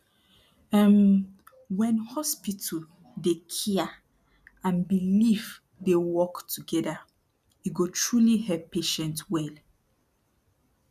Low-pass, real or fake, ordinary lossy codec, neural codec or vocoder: 14.4 kHz; real; none; none